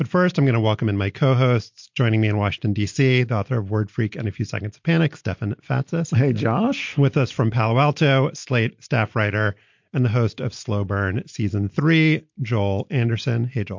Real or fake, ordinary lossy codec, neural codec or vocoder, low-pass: real; MP3, 48 kbps; none; 7.2 kHz